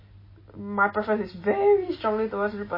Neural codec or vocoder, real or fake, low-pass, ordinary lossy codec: none; real; 5.4 kHz; MP3, 24 kbps